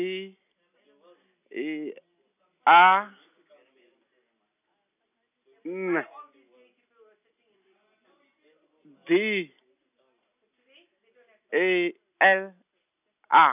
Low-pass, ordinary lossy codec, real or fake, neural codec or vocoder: 3.6 kHz; none; real; none